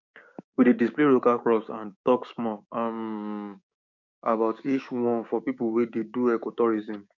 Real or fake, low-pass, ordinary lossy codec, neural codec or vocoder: fake; 7.2 kHz; none; codec, 16 kHz, 6 kbps, DAC